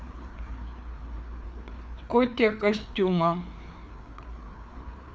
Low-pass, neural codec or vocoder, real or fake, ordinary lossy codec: none; codec, 16 kHz, 4 kbps, FreqCodec, larger model; fake; none